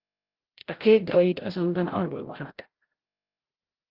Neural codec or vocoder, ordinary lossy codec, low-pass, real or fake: codec, 16 kHz, 0.5 kbps, FreqCodec, larger model; Opus, 16 kbps; 5.4 kHz; fake